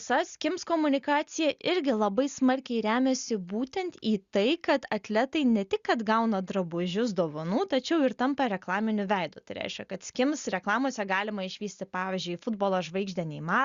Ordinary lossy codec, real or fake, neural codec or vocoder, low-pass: Opus, 64 kbps; real; none; 7.2 kHz